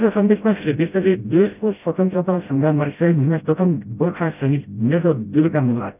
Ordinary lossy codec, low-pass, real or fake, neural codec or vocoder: none; 3.6 kHz; fake; codec, 16 kHz, 0.5 kbps, FreqCodec, smaller model